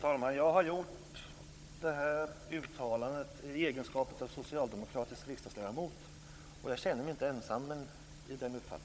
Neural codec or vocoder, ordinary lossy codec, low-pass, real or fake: codec, 16 kHz, 16 kbps, FunCodec, trained on Chinese and English, 50 frames a second; none; none; fake